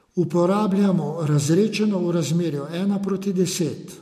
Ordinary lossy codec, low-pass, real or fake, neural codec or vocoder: MP3, 64 kbps; 14.4 kHz; real; none